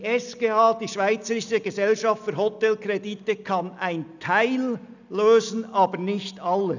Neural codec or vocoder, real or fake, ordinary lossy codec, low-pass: none; real; none; 7.2 kHz